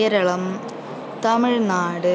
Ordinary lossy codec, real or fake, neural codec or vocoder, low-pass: none; real; none; none